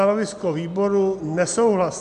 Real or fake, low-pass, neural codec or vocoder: real; 10.8 kHz; none